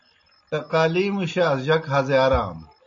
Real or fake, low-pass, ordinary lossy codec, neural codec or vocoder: real; 7.2 kHz; MP3, 48 kbps; none